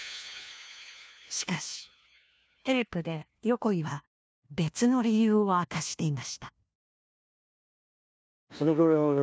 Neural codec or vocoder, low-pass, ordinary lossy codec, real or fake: codec, 16 kHz, 1 kbps, FunCodec, trained on LibriTTS, 50 frames a second; none; none; fake